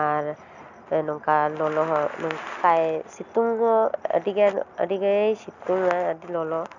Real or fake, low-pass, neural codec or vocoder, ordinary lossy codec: real; 7.2 kHz; none; none